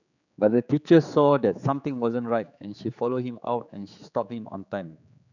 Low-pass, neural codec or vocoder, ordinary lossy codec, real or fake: 7.2 kHz; codec, 16 kHz, 4 kbps, X-Codec, HuBERT features, trained on general audio; none; fake